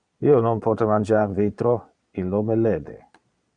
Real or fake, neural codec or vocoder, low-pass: fake; vocoder, 22.05 kHz, 80 mel bands, Vocos; 9.9 kHz